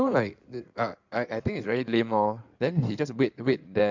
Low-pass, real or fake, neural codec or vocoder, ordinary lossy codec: 7.2 kHz; fake; codec, 16 kHz in and 24 kHz out, 2.2 kbps, FireRedTTS-2 codec; MP3, 64 kbps